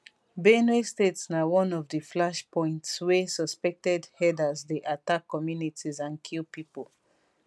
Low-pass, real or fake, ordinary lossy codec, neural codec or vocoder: none; real; none; none